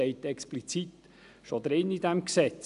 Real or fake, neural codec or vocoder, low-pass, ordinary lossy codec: real; none; 10.8 kHz; none